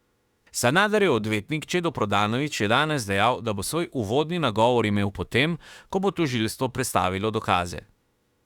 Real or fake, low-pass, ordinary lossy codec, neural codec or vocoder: fake; 19.8 kHz; Opus, 64 kbps; autoencoder, 48 kHz, 32 numbers a frame, DAC-VAE, trained on Japanese speech